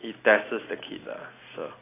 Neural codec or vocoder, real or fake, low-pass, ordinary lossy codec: none; real; 3.6 kHz; AAC, 16 kbps